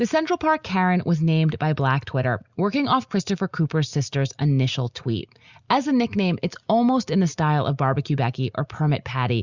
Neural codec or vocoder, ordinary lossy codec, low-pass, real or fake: none; Opus, 64 kbps; 7.2 kHz; real